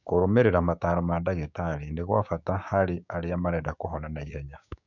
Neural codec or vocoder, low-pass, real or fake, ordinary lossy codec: codec, 16 kHz, 6 kbps, DAC; 7.2 kHz; fake; none